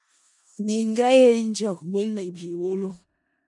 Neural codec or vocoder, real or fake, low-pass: codec, 16 kHz in and 24 kHz out, 0.4 kbps, LongCat-Audio-Codec, four codebook decoder; fake; 10.8 kHz